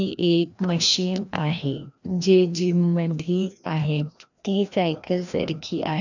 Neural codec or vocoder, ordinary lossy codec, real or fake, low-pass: codec, 16 kHz, 1 kbps, FreqCodec, larger model; none; fake; 7.2 kHz